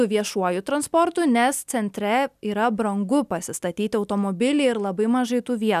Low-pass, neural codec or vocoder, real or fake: 14.4 kHz; none; real